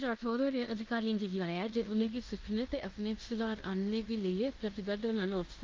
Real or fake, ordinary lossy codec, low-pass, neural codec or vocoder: fake; Opus, 24 kbps; 7.2 kHz; codec, 16 kHz in and 24 kHz out, 0.9 kbps, LongCat-Audio-Codec, fine tuned four codebook decoder